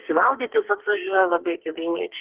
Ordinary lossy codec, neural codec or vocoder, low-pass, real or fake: Opus, 16 kbps; codec, 44.1 kHz, 2.6 kbps, SNAC; 3.6 kHz; fake